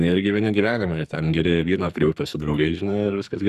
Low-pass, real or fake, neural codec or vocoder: 14.4 kHz; fake; codec, 44.1 kHz, 2.6 kbps, SNAC